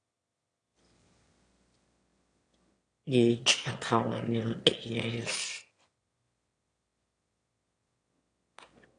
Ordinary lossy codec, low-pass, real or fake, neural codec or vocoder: AAC, 48 kbps; 9.9 kHz; fake; autoencoder, 22.05 kHz, a latent of 192 numbers a frame, VITS, trained on one speaker